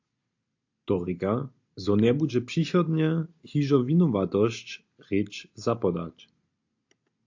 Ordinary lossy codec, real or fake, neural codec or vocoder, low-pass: AAC, 48 kbps; real; none; 7.2 kHz